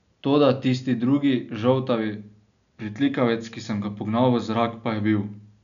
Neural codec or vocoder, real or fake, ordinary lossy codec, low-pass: none; real; none; 7.2 kHz